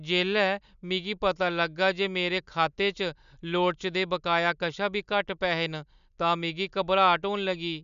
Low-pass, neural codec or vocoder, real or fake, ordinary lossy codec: 7.2 kHz; none; real; none